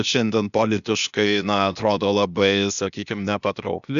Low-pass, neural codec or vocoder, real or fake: 7.2 kHz; codec, 16 kHz, 0.8 kbps, ZipCodec; fake